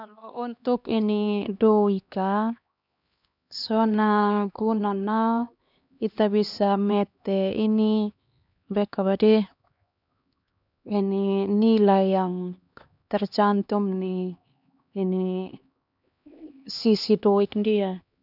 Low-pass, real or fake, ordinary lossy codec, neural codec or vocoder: 5.4 kHz; fake; none; codec, 16 kHz, 2 kbps, X-Codec, HuBERT features, trained on LibriSpeech